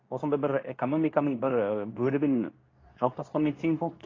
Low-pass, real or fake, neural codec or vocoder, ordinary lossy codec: 7.2 kHz; fake; codec, 24 kHz, 0.9 kbps, WavTokenizer, medium speech release version 2; AAC, 32 kbps